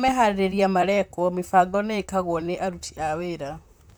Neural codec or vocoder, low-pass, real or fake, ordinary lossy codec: vocoder, 44.1 kHz, 128 mel bands, Pupu-Vocoder; none; fake; none